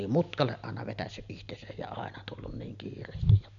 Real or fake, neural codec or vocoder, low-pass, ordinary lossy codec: real; none; 7.2 kHz; none